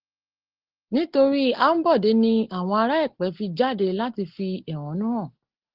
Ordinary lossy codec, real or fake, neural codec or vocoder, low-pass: Opus, 16 kbps; real; none; 5.4 kHz